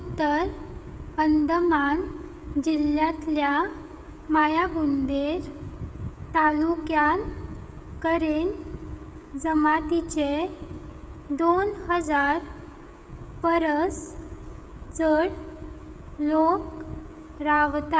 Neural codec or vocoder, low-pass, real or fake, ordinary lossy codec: codec, 16 kHz, 16 kbps, FreqCodec, smaller model; none; fake; none